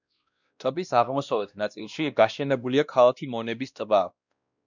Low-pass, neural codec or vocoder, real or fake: 7.2 kHz; codec, 16 kHz, 1 kbps, X-Codec, WavLM features, trained on Multilingual LibriSpeech; fake